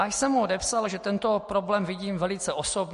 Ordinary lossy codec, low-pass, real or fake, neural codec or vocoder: MP3, 48 kbps; 14.4 kHz; real; none